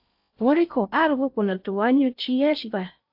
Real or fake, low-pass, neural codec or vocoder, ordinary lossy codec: fake; 5.4 kHz; codec, 16 kHz in and 24 kHz out, 0.6 kbps, FocalCodec, streaming, 4096 codes; AAC, 48 kbps